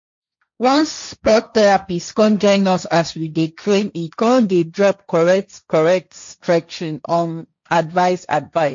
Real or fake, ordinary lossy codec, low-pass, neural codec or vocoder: fake; MP3, 48 kbps; 7.2 kHz; codec, 16 kHz, 1.1 kbps, Voila-Tokenizer